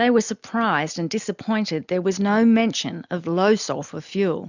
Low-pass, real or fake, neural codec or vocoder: 7.2 kHz; real; none